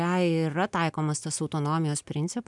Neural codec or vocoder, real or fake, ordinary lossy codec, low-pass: none; real; MP3, 96 kbps; 10.8 kHz